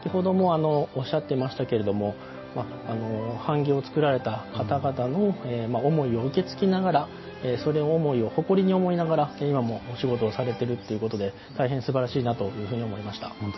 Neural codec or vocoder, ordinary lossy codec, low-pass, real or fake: none; MP3, 24 kbps; 7.2 kHz; real